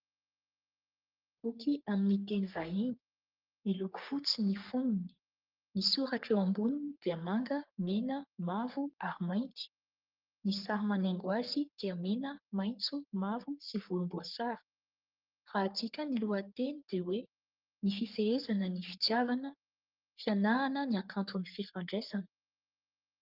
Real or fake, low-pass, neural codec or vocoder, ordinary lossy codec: fake; 5.4 kHz; codec, 44.1 kHz, 3.4 kbps, Pupu-Codec; Opus, 24 kbps